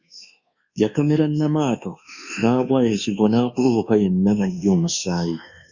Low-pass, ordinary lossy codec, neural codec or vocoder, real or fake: 7.2 kHz; Opus, 64 kbps; codec, 24 kHz, 1.2 kbps, DualCodec; fake